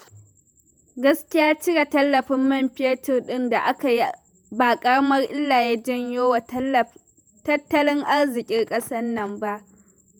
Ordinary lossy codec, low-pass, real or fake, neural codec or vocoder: none; none; fake; vocoder, 48 kHz, 128 mel bands, Vocos